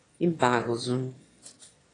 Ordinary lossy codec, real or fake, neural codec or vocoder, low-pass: AAC, 32 kbps; fake; autoencoder, 22.05 kHz, a latent of 192 numbers a frame, VITS, trained on one speaker; 9.9 kHz